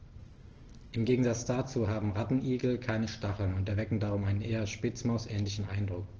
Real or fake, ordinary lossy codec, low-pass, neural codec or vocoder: real; Opus, 16 kbps; 7.2 kHz; none